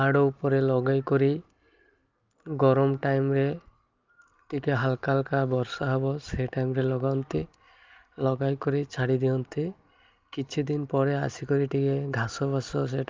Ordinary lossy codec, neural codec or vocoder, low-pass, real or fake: Opus, 32 kbps; none; 7.2 kHz; real